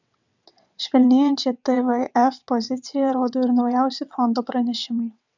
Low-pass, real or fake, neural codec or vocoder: 7.2 kHz; fake; vocoder, 22.05 kHz, 80 mel bands, WaveNeXt